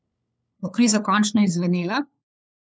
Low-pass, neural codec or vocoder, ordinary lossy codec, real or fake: none; codec, 16 kHz, 4 kbps, FunCodec, trained on LibriTTS, 50 frames a second; none; fake